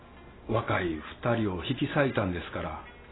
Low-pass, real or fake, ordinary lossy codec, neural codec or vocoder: 7.2 kHz; real; AAC, 16 kbps; none